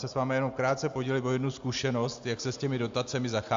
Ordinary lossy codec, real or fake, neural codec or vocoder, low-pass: AAC, 64 kbps; real; none; 7.2 kHz